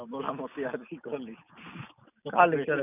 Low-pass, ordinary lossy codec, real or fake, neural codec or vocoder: 3.6 kHz; none; real; none